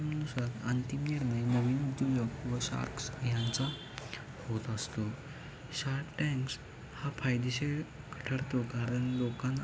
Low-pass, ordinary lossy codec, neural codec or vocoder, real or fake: none; none; none; real